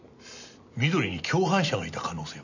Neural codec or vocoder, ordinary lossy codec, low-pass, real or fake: none; none; 7.2 kHz; real